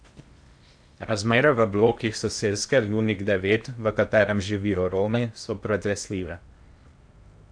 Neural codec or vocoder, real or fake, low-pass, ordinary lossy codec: codec, 16 kHz in and 24 kHz out, 0.8 kbps, FocalCodec, streaming, 65536 codes; fake; 9.9 kHz; AAC, 64 kbps